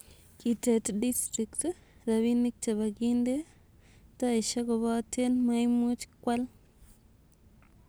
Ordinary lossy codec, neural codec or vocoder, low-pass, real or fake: none; none; none; real